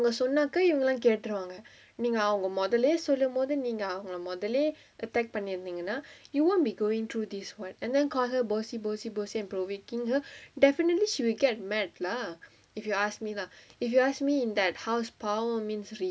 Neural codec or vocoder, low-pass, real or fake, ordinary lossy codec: none; none; real; none